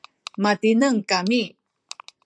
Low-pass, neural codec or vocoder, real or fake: 9.9 kHz; vocoder, 44.1 kHz, 128 mel bands, Pupu-Vocoder; fake